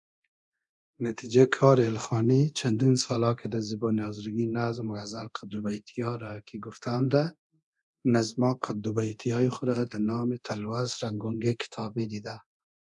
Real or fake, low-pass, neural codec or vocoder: fake; 10.8 kHz; codec, 24 kHz, 0.9 kbps, DualCodec